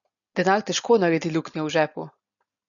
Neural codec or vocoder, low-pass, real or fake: none; 7.2 kHz; real